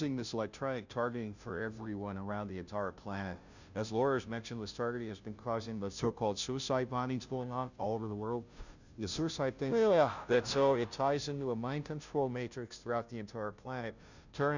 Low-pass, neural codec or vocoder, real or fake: 7.2 kHz; codec, 16 kHz, 0.5 kbps, FunCodec, trained on Chinese and English, 25 frames a second; fake